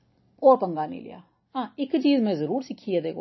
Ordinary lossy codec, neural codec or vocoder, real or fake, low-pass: MP3, 24 kbps; none; real; 7.2 kHz